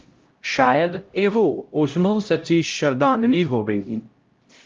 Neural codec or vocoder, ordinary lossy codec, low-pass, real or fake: codec, 16 kHz, 0.5 kbps, X-Codec, HuBERT features, trained on LibriSpeech; Opus, 32 kbps; 7.2 kHz; fake